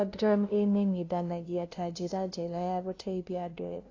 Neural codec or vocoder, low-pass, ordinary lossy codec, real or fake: codec, 16 kHz, 0.5 kbps, FunCodec, trained on LibriTTS, 25 frames a second; 7.2 kHz; AAC, 32 kbps; fake